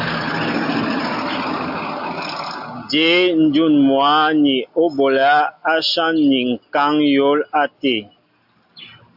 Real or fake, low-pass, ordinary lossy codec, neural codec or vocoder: real; 5.4 kHz; AAC, 48 kbps; none